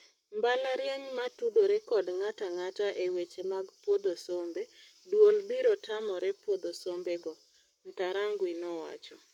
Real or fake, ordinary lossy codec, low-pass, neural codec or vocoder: fake; none; 19.8 kHz; codec, 44.1 kHz, 7.8 kbps, Pupu-Codec